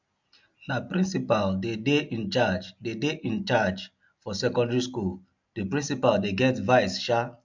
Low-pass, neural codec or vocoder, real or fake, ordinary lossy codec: 7.2 kHz; none; real; MP3, 64 kbps